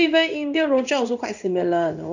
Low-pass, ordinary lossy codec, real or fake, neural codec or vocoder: 7.2 kHz; none; fake; codec, 16 kHz in and 24 kHz out, 1 kbps, XY-Tokenizer